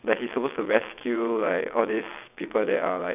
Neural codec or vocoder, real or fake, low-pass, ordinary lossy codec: vocoder, 22.05 kHz, 80 mel bands, WaveNeXt; fake; 3.6 kHz; none